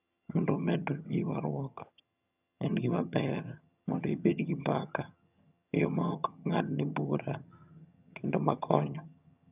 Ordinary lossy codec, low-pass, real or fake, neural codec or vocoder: none; 3.6 kHz; fake; vocoder, 22.05 kHz, 80 mel bands, HiFi-GAN